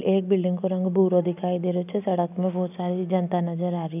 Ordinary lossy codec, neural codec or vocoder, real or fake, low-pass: none; none; real; 3.6 kHz